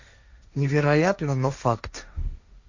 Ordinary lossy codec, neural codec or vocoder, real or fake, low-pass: Opus, 64 kbps; codec, 16 kHz, 1.1 kbps, Voila-Tokenizer; fake; 7.2 kHz